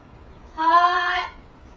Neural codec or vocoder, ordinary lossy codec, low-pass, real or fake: codec, 16 kHz, 8 kbps, FreqCodec, smaller model; none; none; fake